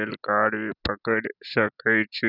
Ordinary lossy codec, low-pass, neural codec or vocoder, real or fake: none; 5.4 kHz; none; real